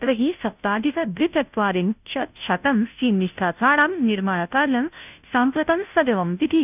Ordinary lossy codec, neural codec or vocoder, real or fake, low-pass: none; codec, 16 kHz, 0.5 kbps, FunCodec, trained on Chinese and English, 25 frames a second; fake; 3.6 kHz